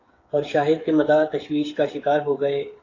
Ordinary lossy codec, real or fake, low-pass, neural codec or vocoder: AAC, 32 kbps; fake; 7.2 kHz; codec, 16 kHz, 8 kbps, FreqCodec, smaller model